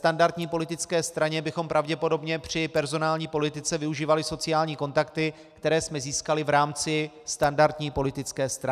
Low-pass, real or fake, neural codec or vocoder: 14.4 kHz; real; none